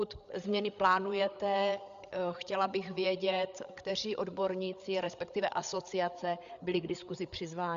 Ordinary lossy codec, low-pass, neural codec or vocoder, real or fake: Opus, 64 kbps; 7.2 kHz; codec, 16 kHz, 8 kbps, FreqCodec, larger model; fake